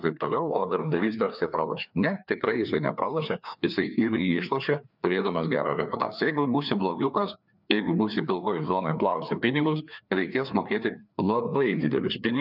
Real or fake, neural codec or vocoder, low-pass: fake; codec, 16 kHz, 2 kbps, FreqCodec, larger model; 5.4 kHz